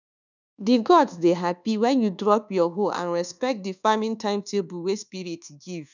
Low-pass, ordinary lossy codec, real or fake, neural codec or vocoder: 7.2 kHz; none; fake; codec, 24 kHz, 1.2 kbps, DualCodec